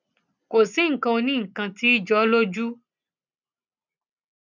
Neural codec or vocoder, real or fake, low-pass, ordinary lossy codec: none; real; 7.2 kHz; none